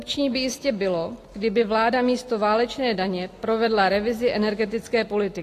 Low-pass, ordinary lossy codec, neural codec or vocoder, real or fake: 14.4 kHz; AAC, 48 kbps; none; real